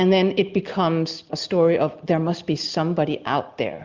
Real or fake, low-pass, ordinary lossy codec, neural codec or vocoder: real; 7.2 kHz; Opus, 32 kbps; none